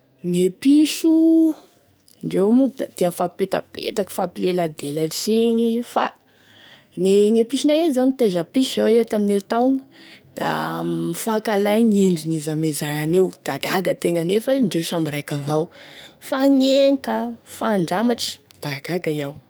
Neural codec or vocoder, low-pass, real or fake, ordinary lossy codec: codec, 44.1 kHz, 2.6 kbps, SNAC; none; fake; none